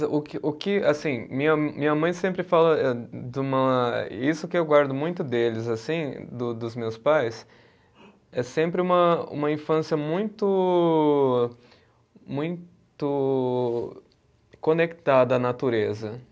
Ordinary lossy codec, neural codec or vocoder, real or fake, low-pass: none; none; real; none